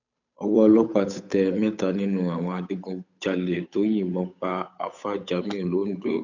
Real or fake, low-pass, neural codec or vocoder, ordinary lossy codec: fake; 7.2 kHz; codec, 16 kHz, 8 kbps, FunCodec, trained on Chinese and English, 25 frames a second; none